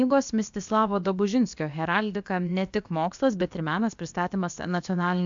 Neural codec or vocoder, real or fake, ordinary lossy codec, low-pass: codec, 16 kHz, about 1 kbps, DyCAST, with the encoder's durations; fake; MP3, 64 kbps; 7.2 kHz